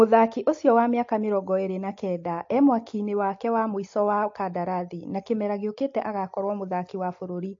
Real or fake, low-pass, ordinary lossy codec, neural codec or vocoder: real; 7.2 kHz; AAC, 48 kbps; none